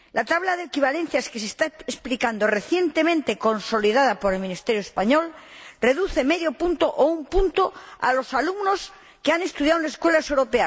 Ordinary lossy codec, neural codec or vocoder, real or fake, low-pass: none; none; real; none